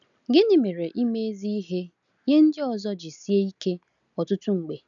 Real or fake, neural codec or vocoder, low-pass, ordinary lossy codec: real; none; 7.2 kHz; none